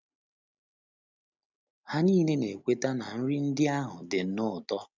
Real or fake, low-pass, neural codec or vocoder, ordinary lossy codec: real; 7.2 kHz; none; none